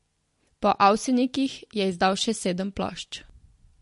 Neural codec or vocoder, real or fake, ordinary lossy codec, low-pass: none; real; MP3, 48 kbps; 10.8 kHz